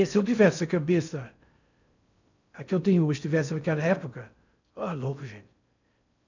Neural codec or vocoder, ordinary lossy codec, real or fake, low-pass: codec, 16 kHz in and 24 kHz out, 0.6 kbps, FocalCodec, streaming, 4096 codes; none; fake; 7.2 kHz